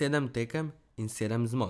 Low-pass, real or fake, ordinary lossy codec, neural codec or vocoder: none; real; none; none